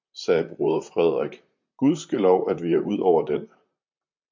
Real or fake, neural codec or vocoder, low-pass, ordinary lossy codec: fake; vocoder, 44.1 kHz, 128 mel bands, Pupu-Vocoder; 7.2 kHz; MP3, 64 kbps